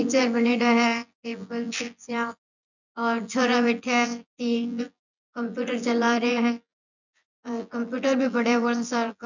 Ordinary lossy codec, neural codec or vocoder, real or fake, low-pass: none; vocoder, 24 kHz, 100 mel bands, Vocos; fake; 7.2 kHz